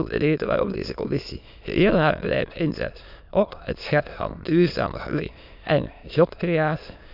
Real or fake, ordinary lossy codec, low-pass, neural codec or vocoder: fake; none; 5.4 kHz; autoencoder, 22.05 kHz, a latent of 192 numbers a frame, VITS, trained on many speakers